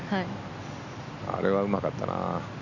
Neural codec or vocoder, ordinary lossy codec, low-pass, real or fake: none; none; 7.2 kHz; real